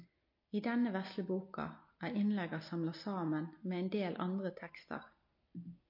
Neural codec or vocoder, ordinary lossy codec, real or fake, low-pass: none; MP3, 32 kbps; real; 5.4 kHz